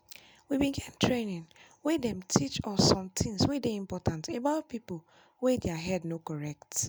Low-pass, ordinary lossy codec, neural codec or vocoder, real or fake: none; none; none; real